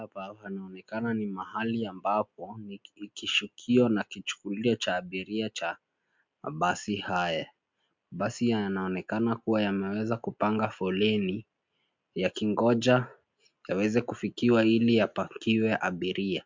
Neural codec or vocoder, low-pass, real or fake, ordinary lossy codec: none; 7.2 kHz; real; MP3, 64 kbps